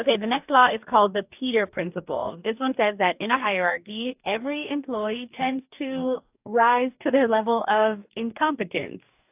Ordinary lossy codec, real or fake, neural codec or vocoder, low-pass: AAC, 32 kbps; fake; codec, 44.1 kHz, 2.6 kbps, DAC; 3.6 kHz